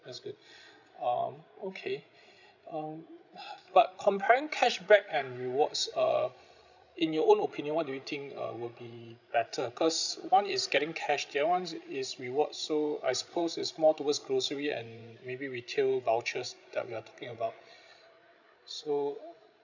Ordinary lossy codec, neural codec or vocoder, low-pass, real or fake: none; codec, 16 kHz, 16 kbps, FreqCodec, larger model; 7.2 kHz; fake